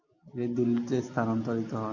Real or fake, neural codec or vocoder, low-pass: real; none; 7.2 kHz